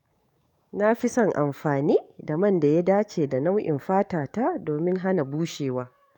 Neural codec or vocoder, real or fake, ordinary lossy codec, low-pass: codec, 44.1 kHz, 7.8 kbps, DAC; fake; none; 19.8 kHz